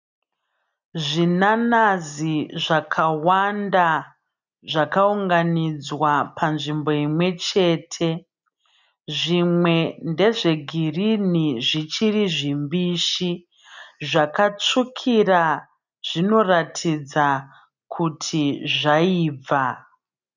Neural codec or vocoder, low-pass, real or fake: none; 7.2 kHz; real